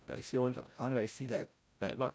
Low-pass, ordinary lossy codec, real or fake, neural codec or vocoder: none; none; fake; codec, 16 kHz, 0.5 kbps, FreqCodec, larger model